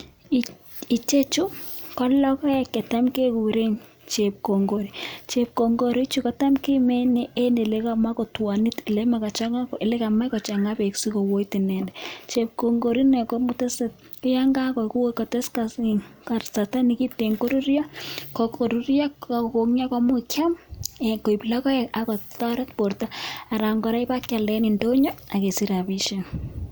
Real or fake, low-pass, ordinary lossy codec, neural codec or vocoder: real; none; none; none